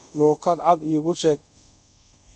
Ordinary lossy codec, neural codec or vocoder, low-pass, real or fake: none; codec, 24 kHz, 0.5 kbps, DualCodec; 10.8 kHz; fake